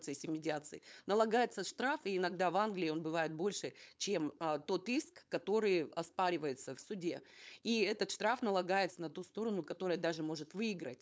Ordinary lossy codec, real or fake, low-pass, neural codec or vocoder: none; fake; none; codec, 16 kHz, 4.8 kbps, FACodec